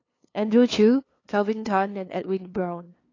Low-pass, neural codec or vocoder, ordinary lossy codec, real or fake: 7.2 kHz; codec, 16 kHz, 2 kbps, FunCodec, trained on LibriTTS, 25 frames a second; AAC, 32 kbps; fake